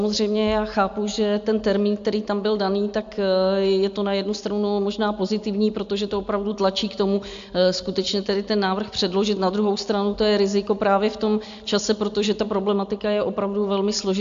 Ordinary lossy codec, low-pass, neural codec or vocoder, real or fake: AAC, 64 kbps; 7.2 kHz; none; real